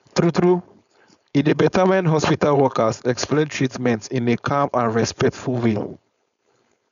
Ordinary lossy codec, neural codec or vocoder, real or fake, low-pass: none; codec, 16 kHz, 4.8 kbps, FACodec; fake; 7.2 kHz